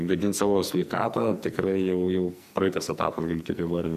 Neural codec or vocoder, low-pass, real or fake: codec, 44.1 kHz, 2.6 kbps, SNAC; 14.4 kHz; fake